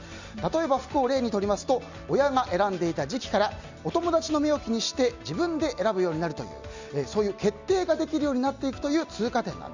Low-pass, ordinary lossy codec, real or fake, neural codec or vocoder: 7.2 kHz; Opus, 64 kbps; real; none